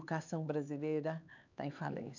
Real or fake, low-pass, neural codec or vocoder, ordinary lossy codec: fake; 7.2 kHz; codec, 16 kHz, 4 kbps, X-Codec, HuBERT features, trained on LibriSpeech; none